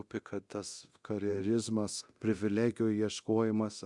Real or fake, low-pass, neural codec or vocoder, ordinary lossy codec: fake; 10.8 kHz; codec, 24 kHz, 0.9 kbps, DualCodec; Opus, 64 kbps